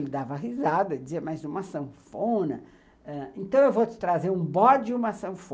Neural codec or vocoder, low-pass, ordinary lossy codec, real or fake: none; none; none; real